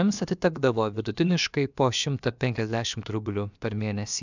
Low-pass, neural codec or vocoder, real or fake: 7.2 kHz; codec, 16 kHz, about 1 kbps, DyCAST, with the encoder's durations; fake